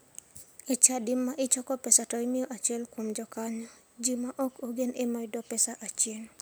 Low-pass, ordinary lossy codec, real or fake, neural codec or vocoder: none; none; real; none